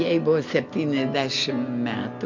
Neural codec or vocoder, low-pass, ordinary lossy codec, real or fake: none; 7.2 kHz; MP3, 48 kbps; real